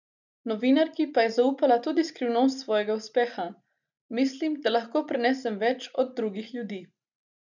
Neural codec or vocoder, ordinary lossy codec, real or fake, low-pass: none; none; real; 7.2 kHz